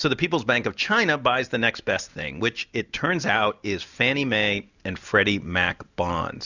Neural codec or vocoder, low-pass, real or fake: none; 7.2 kHz; real